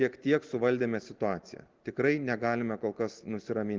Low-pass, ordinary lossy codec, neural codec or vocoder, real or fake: 7.2 kHz; Opus, 16 kbps; none; real